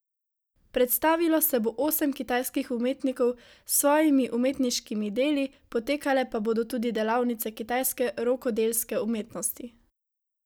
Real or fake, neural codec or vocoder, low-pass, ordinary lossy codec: real; none; none; none